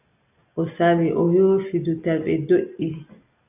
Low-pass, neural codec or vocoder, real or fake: 3.6 kHz; none; real